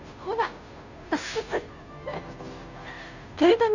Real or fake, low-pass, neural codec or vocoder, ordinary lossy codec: fake; 7.2 kHz; codec, 16 kHz, 0.5 kbps, FunCodec, trained on Chinese and English, 25 frames a second; none